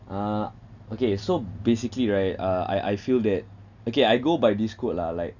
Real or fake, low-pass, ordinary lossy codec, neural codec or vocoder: real; 7.2 kHz; none; none